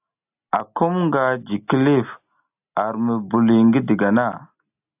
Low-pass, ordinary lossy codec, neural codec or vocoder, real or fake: 3.6 kHz; AAC, 32 kbps; none; real